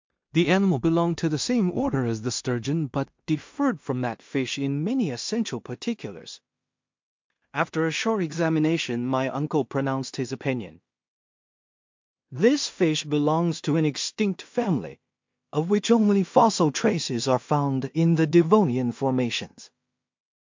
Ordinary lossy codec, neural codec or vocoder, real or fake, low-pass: MP3, 48 kbps; codec, 16 kHz in and 24 kHz out, 0.4 kbps, LongCat-Audio-Codec, two codebook decoder; fake; 7.2 kHz